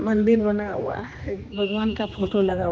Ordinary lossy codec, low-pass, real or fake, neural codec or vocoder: none; none; fake; codec, 16 kHz, 2 kbps, X-Codec, HuBERT features, trained on general audio